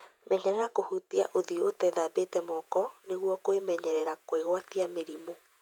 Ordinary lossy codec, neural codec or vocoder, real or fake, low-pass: none; vocoder, 48 kHz, 128 mel bands, Vocos; fake; 19.8 kHz